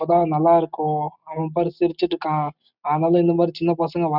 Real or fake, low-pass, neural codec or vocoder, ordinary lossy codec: real; 5.4 kHz; none; none